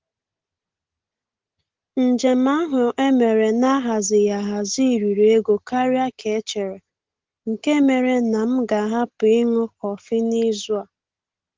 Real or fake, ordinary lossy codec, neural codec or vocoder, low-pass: real; Opus, 16 kbps; none; 7.2 kHz